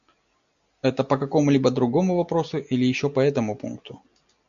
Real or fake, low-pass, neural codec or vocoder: real; 7.2 kHz; none